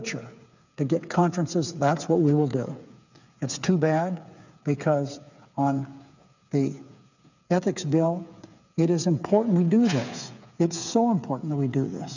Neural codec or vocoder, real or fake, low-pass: codec, 16 kHz, 8 kbps, FreqCodec, smaller model; fake; 7.2 kHz